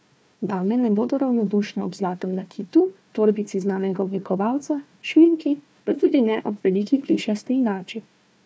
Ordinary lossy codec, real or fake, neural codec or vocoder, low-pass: none; fake; codec, 16 kHz, 1 kbps, FunCodec, trained on Chinese and English, 50 frames a second; none